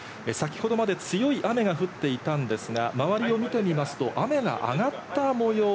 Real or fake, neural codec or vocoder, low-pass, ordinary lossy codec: real; none; none; none